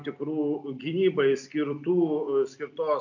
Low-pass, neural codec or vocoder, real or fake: 7.2 kHz; none; real